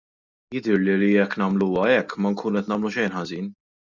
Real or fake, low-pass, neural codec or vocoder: real; 7.2 kHz; none